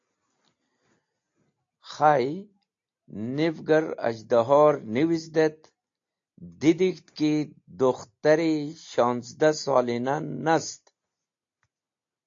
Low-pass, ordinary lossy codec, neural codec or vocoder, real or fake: 7.2 kHz; AAC, 48 kbps; none; real